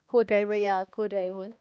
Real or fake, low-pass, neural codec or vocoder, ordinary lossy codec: fake; none; codec, 16 kHz, 1 kbps, X-Codec, HuBERT features, trained on balanced general audio; none